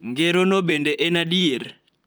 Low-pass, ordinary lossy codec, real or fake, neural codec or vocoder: none; none; fake; vocoder, 44.1 kHz, 128 mel bands, Pupu-Vocoder